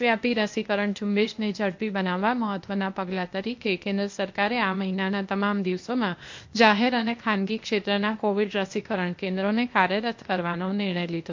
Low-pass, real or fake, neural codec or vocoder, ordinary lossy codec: 7.2 kHz; fake; codec, 16 kHz, 0.8 kbps, ZipCodec; MP3, 48 kbps